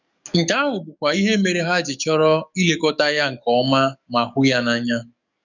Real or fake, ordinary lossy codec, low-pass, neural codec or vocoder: fake; none; 7.2 kHz; codec, 44.1 kHz, 7.8 kbps, DAC